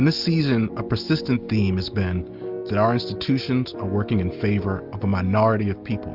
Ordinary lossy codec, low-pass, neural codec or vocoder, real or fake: Opus, 32 kbps; 5.4 kHz; none; real